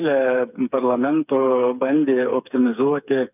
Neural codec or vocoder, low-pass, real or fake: codec, 16 kHz, 4 kbps, FreqCodec, smaller model; 3.6 kHz; fake